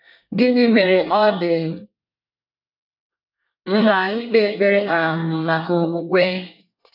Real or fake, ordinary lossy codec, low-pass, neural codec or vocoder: fake; none; 5.4 kHz; codec, 24 kHz, 1 kbps, SNAC